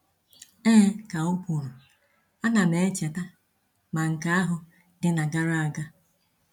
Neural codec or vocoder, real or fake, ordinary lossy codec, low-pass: none; real; none; 19.8 kHz